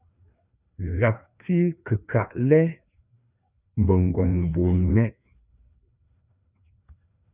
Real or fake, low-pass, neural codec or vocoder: fake; 3.6 kHz; codec, 16 kHz, 2 kbps, FreqCodec, larger model